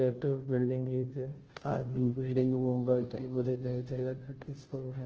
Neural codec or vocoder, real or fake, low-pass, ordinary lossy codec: codec, 16 kHz, 0.5 kbps, FunCodec, trained on Chinese and English, 25 frames a second; fake; 7.2 kHz; Opus, 16 kbps